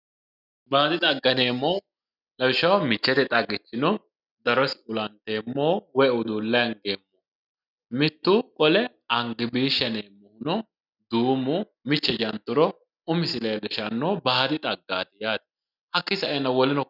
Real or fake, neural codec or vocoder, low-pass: real; none; 5.4 kHz